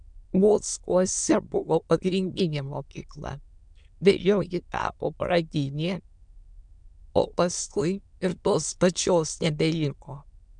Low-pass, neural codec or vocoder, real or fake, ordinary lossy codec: 9.9 kHz; autoencoder, 22.05 kHz, a latent of 192 numbers a frame, VITS, trained on many speakers; fake; MP3, 96 kbps